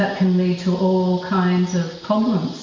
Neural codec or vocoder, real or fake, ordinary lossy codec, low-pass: none; real; MP3, 48 kbps; 7.2 kHz